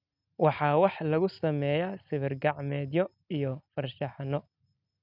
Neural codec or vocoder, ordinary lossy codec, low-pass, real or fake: none; none; 5.4 kHz; real